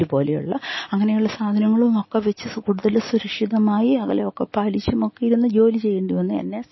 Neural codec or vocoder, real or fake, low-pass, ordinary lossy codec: none; real; 7.2 kHz; MP3, 24 kbps